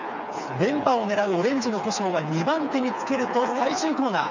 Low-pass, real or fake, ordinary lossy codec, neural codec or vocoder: 7.2 kHz; fake; none; codec, 16 kHz, 4 kbps, FreqCodec, smaller model